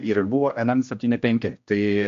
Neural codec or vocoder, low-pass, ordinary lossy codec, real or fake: codec, 16 kHz, 1 kbps, X-Codec, HuBERT features, trained on general audio; 7.2 kHz; MP3, 48 kbps; fake